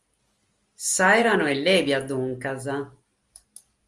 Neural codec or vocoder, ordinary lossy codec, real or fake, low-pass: none; Opus, 32 kbps; real; 10.8 kHz